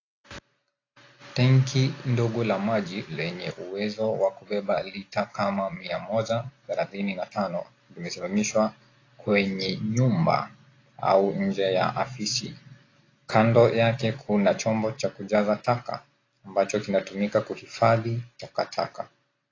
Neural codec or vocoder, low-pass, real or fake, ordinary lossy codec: none; 7.2 kHz; real; AAC, 32 kbps